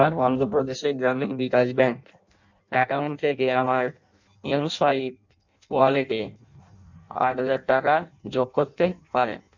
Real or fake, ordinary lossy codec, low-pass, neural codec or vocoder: fake; none; 7.2 kHz; codec, 16 kHz in and 24 kHz out, 0.6 kbps, FireRedTTS-2 codec